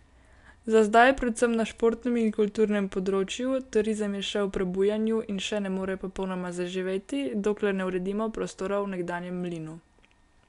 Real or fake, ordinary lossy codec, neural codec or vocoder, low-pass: real; none; none; 10.8 kHz